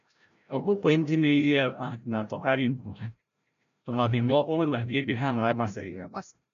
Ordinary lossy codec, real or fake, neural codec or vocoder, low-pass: none; fake; codec, 16 kHz, 0.5 kbps, FreqCodec, larger model; 7.2 kHz